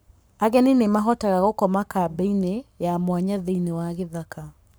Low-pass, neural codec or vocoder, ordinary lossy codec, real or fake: none; codec, 44.1 kHz, 7.8 kbps, Pupu-Codec; none; fake